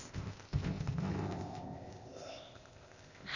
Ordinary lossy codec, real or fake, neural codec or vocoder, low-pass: none; fake; codec, 16 kHz, 0.8 kbps, ZipCodec; 7.2 kHz